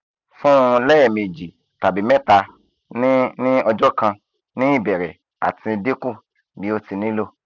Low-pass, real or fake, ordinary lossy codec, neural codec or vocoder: 7.2 kHz; real; none; none